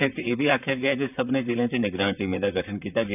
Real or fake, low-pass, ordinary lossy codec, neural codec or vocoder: fake; 3.6 kHz; none; vocoder, 44.1 kHz, 128 mel bands, Pupu-Vocoder